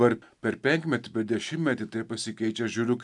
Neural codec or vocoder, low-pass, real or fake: none; 10.8 kHz; real